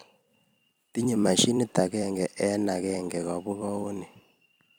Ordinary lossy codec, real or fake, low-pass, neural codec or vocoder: none; fake; none; vocoder, 44.1 kHz, 128 mel bands every 256 samples, BigVGAN v2